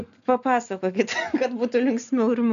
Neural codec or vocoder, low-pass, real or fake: none; 7.2 kHz; real